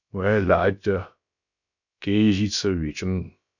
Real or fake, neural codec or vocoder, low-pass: fake; codec, 16 kHz, about 1 kbps, DyCAST, with the encoder's durations; 7.2 kHz